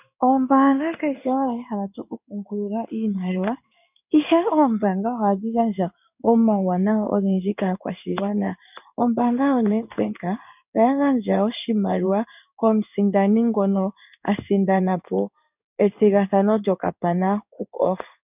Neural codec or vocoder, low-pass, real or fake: codec, 16 kHz in and 24 kHz out, 1 kbps, XY-Tokenizer; 3.6 kHz; fake